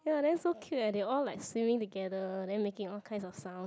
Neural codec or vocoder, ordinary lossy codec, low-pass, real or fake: none; none; none; real